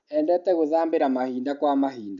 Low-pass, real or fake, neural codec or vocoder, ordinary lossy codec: 7.2 kHz; real; none; none